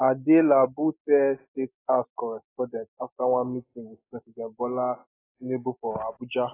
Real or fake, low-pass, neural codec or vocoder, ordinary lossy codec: real; 3.6 kHz; none; AAC, 16 kbps